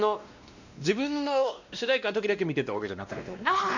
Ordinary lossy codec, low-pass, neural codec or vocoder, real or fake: none; 7.2 kHz; codec, 16 kHz, 1 kbps, X-Codec, WavLM features, trained on Multilingual LibriSpeech; fake